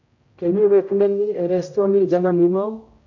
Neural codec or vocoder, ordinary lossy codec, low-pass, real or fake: codec, 16 kHz, 0.5 kbps, X-Codec, HuBERT features, trained on general audio; MP3, 48 kbps; 7.2 kHz; fake